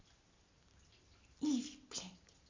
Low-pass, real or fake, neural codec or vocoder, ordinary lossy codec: 7.2 kHz; real; none; MP3, 64 kbps